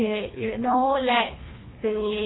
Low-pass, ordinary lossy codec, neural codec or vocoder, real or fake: 7.2 kHz; AAC, 16 kbps; codec, 24 kHz, 1.5 kbps, HILCodec; fake